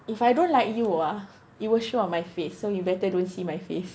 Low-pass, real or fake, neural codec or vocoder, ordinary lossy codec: none; real; none; none